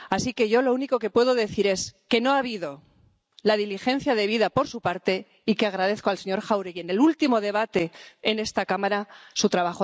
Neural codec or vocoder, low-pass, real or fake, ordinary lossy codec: none; none; real; none